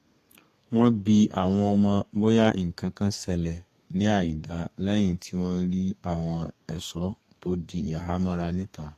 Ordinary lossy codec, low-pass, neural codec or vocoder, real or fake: AAC, 48 kbps; 14.4 kHz; codec, 32 kHz, 1.9 kbps, SNAC; fake